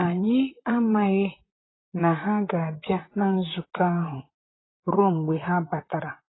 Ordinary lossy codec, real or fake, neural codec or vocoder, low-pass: AAC, 16 kbps; fake; vocoder, 44.1 kHz, 128 mel bands, Pupu-Vocoder; 7.2 kHz